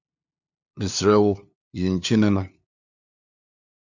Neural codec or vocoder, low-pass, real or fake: codec, 16 kHz, 2 kbps, FunCodec, trained on LibriTTS, 25 frames a second; 7.2 kHz; fake